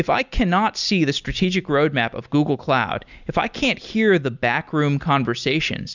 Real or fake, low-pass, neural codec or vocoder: real; 7.2 kHz; none